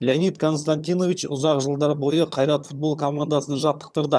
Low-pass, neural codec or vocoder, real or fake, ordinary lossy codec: none; vocoder, 22.05 kHz, 80 mel bands, HiFi-GAN; fake; none